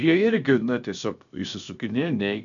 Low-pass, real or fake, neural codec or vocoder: 7.2 kHz; fake; codec, 16 kHz, about 1 kbps, DyCAST, with the encoder's durations